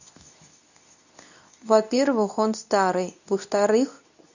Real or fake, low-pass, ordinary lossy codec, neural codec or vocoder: fake; 7.2 kHz; none; codec, 24 kHz, 0.9 kbps, WavTokenizer, medium speech release version 2